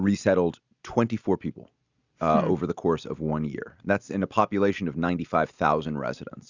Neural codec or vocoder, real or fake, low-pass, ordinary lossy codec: none; real; 7.2 kHz; Opus, 64 kbps